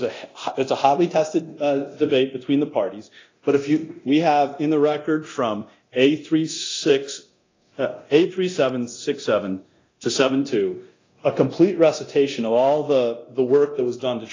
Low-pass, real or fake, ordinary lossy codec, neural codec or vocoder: 7.2 kHz; fake; AAC, 32 kbps; codec, 24 kHz, 0.9 kbps, DualCodec